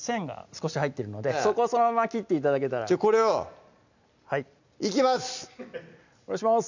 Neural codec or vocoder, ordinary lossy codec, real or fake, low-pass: none; none; real; 7.2 kHz